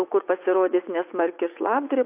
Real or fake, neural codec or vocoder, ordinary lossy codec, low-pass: fake; vocoder, 44.1 kHz, 80 mel bands, Vocos; AAC, 32 kbps; 3.6 kHz